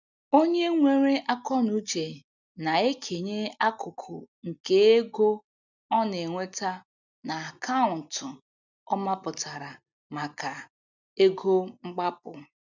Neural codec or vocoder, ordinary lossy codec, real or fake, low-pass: none; none; real; 7.2 kHz